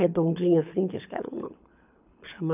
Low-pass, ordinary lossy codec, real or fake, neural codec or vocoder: 3.6 kHz; none; fake; codec, 24 kHz, 6 kbps, HILCodec